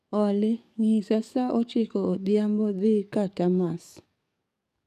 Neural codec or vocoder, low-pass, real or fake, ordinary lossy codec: codec, 44.1 kHz, 7.8 kbps, DAC; 14.4 kHz; fake; none